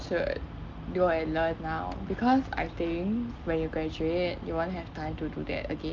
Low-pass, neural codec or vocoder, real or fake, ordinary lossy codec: 7.2 kHz; none; real; Opus, 24 kbps